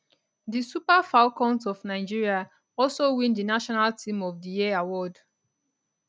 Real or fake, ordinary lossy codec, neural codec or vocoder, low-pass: real; none; none; none